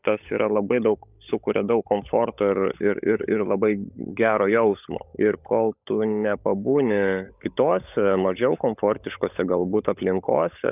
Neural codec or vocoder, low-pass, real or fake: codec, 16 kHz, 16 kbps, FunCodec, trained on LibriTTS, 50 frames a second; 3.6 kHz; fake